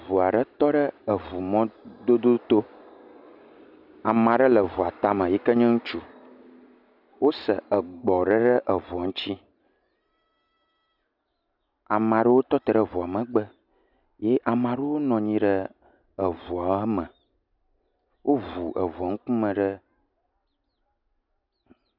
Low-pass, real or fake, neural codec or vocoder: 5.4 kHz; real; none